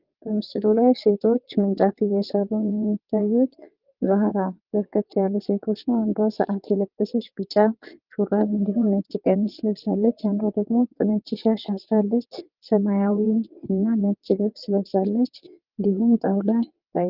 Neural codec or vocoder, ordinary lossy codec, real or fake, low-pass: vocoder, 22.05 kHz, 80 mel bands, WaveNeXt; Opus, 32 kbps; fake; 5.4 kHz